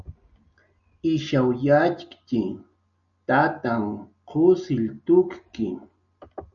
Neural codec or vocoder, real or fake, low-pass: none; real; 7.2 kHz